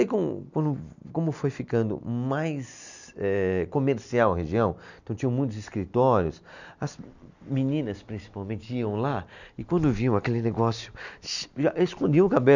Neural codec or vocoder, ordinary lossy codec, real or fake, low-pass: none; none; real; 7.2 kHz